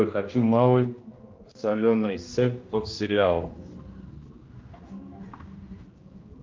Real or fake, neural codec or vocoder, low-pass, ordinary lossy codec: fake; codec, 16 kHz, 1 kbps, X-Codec, HuBERT features, trained on general audio; 7.2 kHz; Opus, 24 kbps